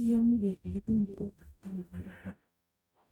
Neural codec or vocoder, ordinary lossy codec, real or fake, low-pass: codec, 44.1 kHz, 0.9 kbps, DAC; none; fake; 19.8 kHz